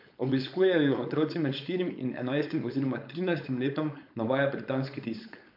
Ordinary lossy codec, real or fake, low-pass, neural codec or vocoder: MP3, 48 kbps; fake; 5.4 kHz; codec, 16 kHz, 4.8 kbps, FACodec